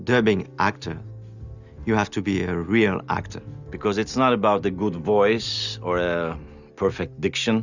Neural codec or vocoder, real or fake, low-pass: none; real; 7.2 kHz